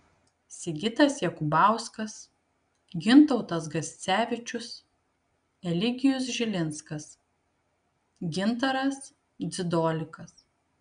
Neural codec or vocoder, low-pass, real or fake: none; 9.9 kHz; real